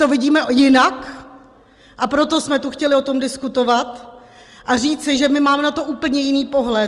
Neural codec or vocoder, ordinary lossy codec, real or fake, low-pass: none; Opus, 24 kbps; real; 10.8 kHz